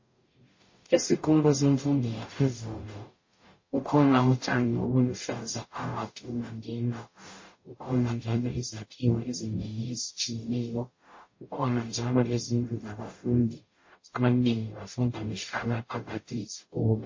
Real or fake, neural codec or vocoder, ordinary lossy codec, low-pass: fake; codec, 44.1 kHz, 0.9 kbps, DAC; MP3, 32 kbps; 7.2 kHz